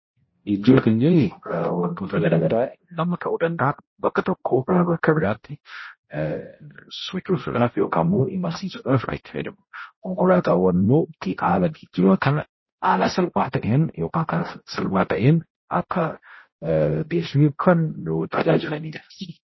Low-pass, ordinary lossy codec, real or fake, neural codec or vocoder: 7.2 kHz; MP3, 24 kbps; fake; codec, 16 kHz, 0.5 kbps, X-Codec, HuBERT features, trained on balanced general audio